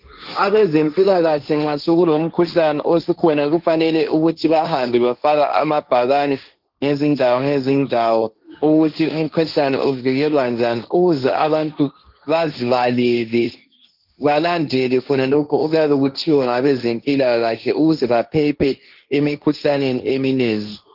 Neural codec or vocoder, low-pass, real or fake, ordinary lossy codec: codec, 16 kHz, 1.1 kbps, Voila-Tokenizer; 5.4 kHz; fake; Opus, 32 kbps